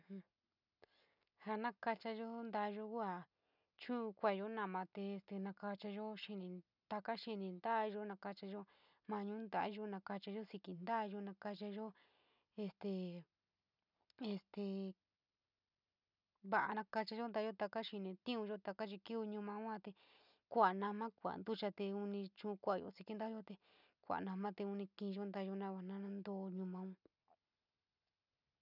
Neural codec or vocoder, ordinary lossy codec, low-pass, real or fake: none; none; 5.4 kHz; real